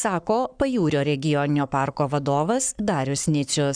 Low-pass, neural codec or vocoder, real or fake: 9.9 kHz; codec, 44.1 kHz, 7.8 kbps, Pupu-Codec; fake